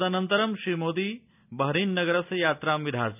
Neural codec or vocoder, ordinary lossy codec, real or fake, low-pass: none; none; real; 3.6 kHz